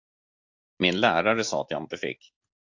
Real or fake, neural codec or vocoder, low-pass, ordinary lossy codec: real; none; 7.2 kHz; AAC, 48 kbps